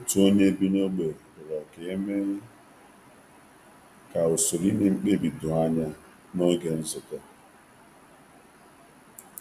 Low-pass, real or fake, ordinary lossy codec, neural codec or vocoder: 14.4 kHz; real; none; none